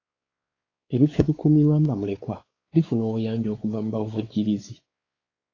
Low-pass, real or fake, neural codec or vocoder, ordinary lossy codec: 7.2 kHz; fake; codec, 16 kHz, 4 kbps, X-Codec, WavLM features, trained on Multilingual LibriSpeech; AAC, 32 kbps